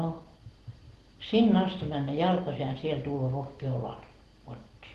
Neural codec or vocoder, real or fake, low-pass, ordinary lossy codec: none; real; 14.4 kHz; Opus, 16 kbps